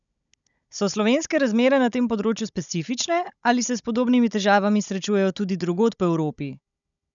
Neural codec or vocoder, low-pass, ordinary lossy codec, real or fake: codec, 16 kHz, 16 kbps, FunCodec, trained on Chinese and English, 50 frames a second; 7.2 kHz; none; fake